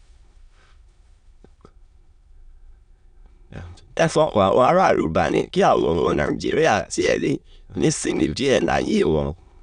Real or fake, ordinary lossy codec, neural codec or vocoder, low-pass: fake; none; autoencoder, 22.05 kHz, a latent of 192 numbers a frame, VITS, trained on many speakers; 9.9 kHz